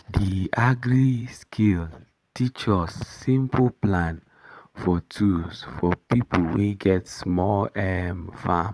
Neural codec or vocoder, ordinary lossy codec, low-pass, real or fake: vocoder, 22.05 kHz, 80 mel bands, Vocos; none; none; fake